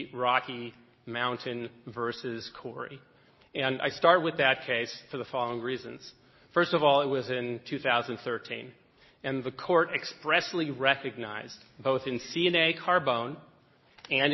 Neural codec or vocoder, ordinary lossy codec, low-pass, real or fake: none; MP3, 24 kbps; 7.2 kHz; real